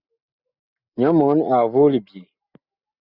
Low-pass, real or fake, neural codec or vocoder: 5.4 kHz; real; none